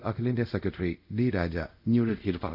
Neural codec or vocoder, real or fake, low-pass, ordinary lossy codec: codec, 24 kHz, 0.5 kbps, DualCodec; fake; 5.4 kHz; none